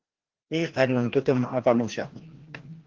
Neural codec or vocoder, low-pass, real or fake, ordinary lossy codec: codec, 16 kHz, 1 kbps, FreqCodec, larger model; 7.2 kHz; fake; Opus, 16 kbps